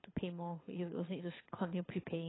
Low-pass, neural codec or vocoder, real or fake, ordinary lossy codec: 7.2 kHz; codec, 24 kHz, 6 kbps, HILCodec; fake; AAC, 16 kbps